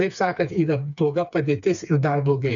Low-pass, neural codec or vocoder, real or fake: 7.2 kHz; codec, 16 kHz, 4 kbps, FreqCodec, smaller model; fake